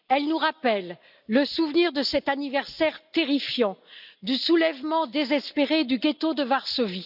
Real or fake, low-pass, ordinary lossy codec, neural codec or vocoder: real; 5.4 kHz; none; none